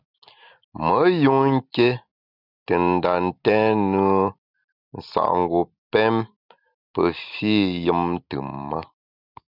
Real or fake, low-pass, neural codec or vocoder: real; 5.4 kHz; none